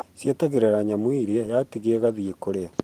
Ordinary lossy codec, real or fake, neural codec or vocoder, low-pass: Opus, 24 kbps; fake; vocoder, 44.1 kHz, 128 mel bands every 512 samples, BigVGAN v2; 14.4 kHz